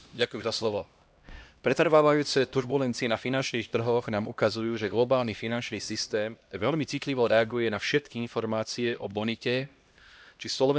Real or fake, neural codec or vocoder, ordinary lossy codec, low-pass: fake; codec, 16 kHz, 1 kbps, X-Codec, HuBERT features, trained on LibriSpeech; none; none